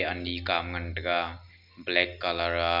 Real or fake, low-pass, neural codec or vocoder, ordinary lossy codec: real; 5.4 kHz; none; none